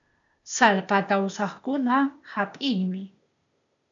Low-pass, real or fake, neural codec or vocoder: 7.2 kHz; fake; codec, 16 kHz, 0.8 kbps, ZipCodec